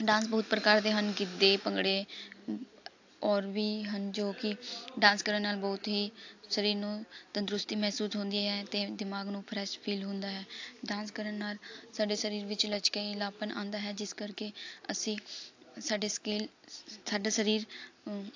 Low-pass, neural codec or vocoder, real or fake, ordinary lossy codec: 7.2 kHz; none; real; AAC, 48 kbps